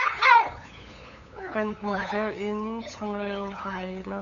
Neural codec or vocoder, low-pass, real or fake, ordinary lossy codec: codec, 16 kHz, 8 kbps, FunCodec, trained on LibriTTS, 25 frames a second; 7.2 kHz; fake; MP3, 96 kbps